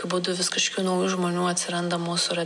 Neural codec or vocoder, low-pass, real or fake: none; 10.8 kHz; real